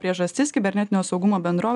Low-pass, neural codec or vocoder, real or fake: 10.8 kHz; none; real